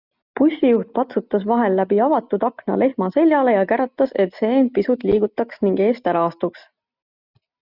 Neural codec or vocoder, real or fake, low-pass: none; real; 5.4 kHz